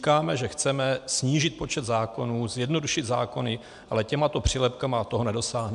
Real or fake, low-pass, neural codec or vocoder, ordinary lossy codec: real; 10.8 kHz; none; AAC, 96 kbps